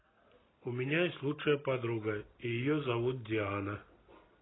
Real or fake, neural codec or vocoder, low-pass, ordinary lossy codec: real; none; 7.2 kHz; AAC, 16 kbps